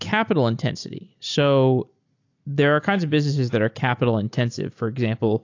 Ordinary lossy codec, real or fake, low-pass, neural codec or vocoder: AAC, 48 kbps; real; 7.2 kHz; none